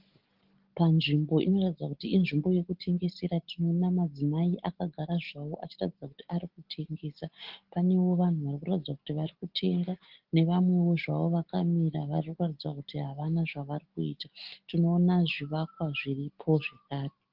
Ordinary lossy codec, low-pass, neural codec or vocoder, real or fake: Opus, 16 kbps; 5.4 kHz; none; real